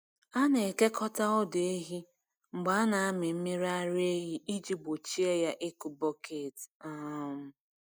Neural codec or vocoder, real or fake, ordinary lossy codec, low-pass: none; real; none; none